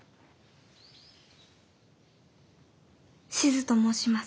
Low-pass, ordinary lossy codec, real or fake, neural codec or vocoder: none; none; real; none